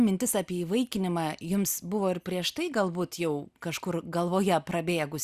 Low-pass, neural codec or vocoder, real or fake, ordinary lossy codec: 14.4 kHz; none; real; Opus, 64 kbps